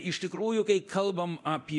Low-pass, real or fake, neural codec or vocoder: 10.8 kHz; fake; codec, 24 kHz, 0.9 kbps, DualCodec